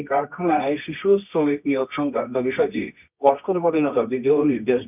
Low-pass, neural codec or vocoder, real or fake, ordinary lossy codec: 3.6 kHz; codec, 24 kHz, 0.9 kbps, WavTokenizer, medium music audio release; fake; none